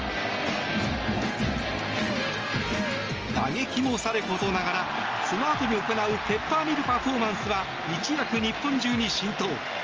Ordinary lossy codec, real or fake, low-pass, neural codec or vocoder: Opus, 24 kbps; real; 7.2 kHz; none